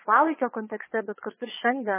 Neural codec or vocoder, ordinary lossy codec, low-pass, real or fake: vocoder, 22.05 kHz, 80 mel bands, Vocos; MP3, 16 kbps; 3.6 kHz; fake